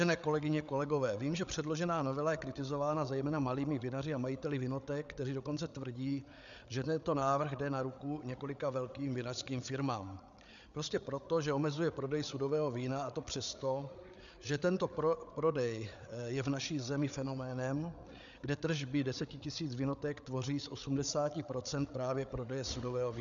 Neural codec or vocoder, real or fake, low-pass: codec, 16 kHz, 8 kbps, FreqCodec, larger model; fake; 7.2 kHz